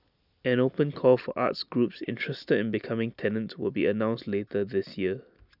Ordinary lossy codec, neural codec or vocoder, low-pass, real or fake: none; none; 5.4 kHz; real